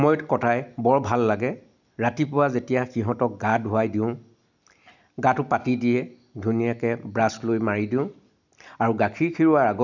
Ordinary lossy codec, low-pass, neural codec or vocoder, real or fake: none; 7.2 kHz; none; real